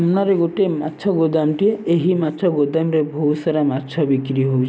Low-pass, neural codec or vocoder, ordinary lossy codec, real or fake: none; none; none; real